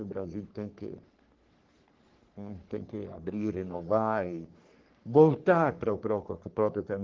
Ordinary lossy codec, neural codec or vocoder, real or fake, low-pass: Opus, 16 kbps; codec, 44.1 kHz, 3.4 kbps, Pupu-Codec; fake; 7.2 kHz